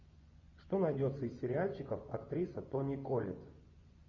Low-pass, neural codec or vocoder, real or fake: 7.2 kHz; vocoder, 24 kHz, 100 mel bands, Vocos; fake